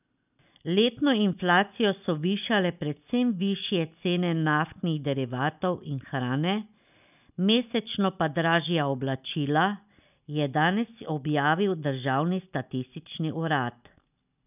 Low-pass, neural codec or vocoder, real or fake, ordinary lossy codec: 3.6 kHz; none; real; none